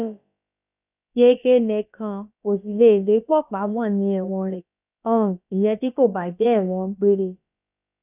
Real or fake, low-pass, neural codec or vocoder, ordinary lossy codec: fake; 3.6 kHz; codec, 16 kHz, about 1 kbps, DyCAST, with the encoder's durations; none